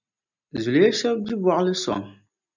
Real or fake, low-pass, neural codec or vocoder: real; 7.2 kHz; none